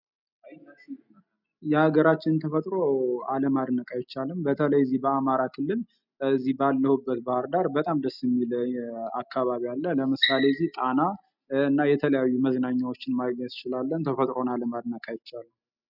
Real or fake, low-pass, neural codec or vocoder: real; 5.4 kHz; none